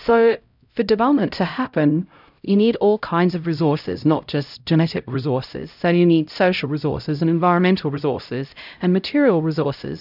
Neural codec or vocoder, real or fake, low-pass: codec, 16 kHz, 0.5 kbps, X-Codec, HuBERT features, trained on LibriSpeech; fake; 5.4 kHz